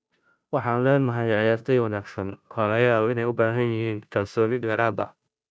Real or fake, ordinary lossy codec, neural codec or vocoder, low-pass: fake; none; codec, 16 kHz, 0.5 kbps, FunCodec, trained on Chinese and English, 25 frames a second; none